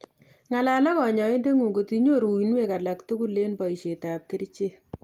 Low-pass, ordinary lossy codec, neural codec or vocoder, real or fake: 19.8 kHz; Opus, 32 kbps; none; real